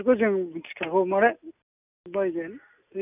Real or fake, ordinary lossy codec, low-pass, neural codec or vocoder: real; none; 3.6 kHz; none